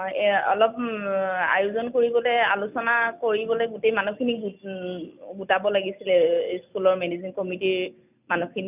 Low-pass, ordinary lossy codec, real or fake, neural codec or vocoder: 3.6 kHz; none; real; none